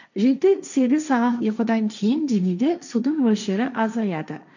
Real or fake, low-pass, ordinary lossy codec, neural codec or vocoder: fake; 7.2 kHz; none; codec, 16 kHz, 1.1 kbps, Voila-Tokenizer